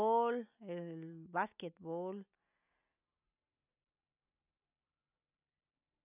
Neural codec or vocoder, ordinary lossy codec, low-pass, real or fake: none; none; 3.6 kHz; real